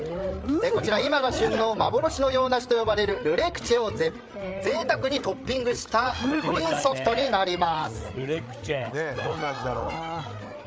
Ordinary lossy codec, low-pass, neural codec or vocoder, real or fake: none; none; codec, 16 kHz, 8 kbps, FreqCodec, larger model; fake